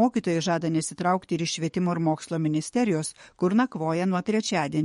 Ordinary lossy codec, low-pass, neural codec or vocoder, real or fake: MP3, 48 kbps; 19.8 kHz; codec, 44.1 kHz, 7.8 kbps, DAC; fake